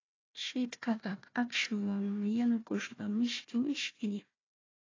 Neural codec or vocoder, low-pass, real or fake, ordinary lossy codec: codec, 16 kHz, 1 kbps, FunCodec, trained on Chinese and English, 50 frames a second; 7.2 kHz; fake; AAC, 32 kbps